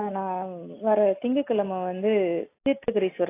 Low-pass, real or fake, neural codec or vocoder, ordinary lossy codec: 3.6 kHz; real; none; AAC, 24 kbps